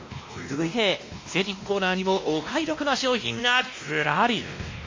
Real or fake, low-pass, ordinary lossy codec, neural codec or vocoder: fake; 7.2 kHz; MP3, 32 kbps; codec, 16 kHz, 1 kbps, X-Codec, WavLM features, trained on Multilingual LibriSpeech